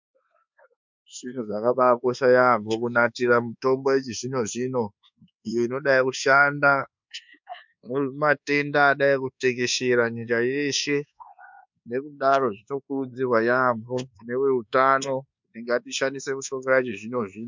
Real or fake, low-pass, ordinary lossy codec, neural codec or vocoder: fake; 7.2 kHz; MP3, 64 kbps; codec, 24 kHz, 1.2 kbps, DualCodec